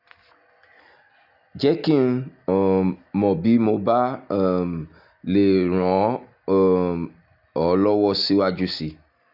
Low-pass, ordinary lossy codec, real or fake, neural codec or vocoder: 5.4 kHz; none; real; none